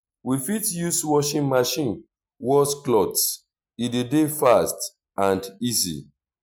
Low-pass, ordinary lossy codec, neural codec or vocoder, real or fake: none; none; none; real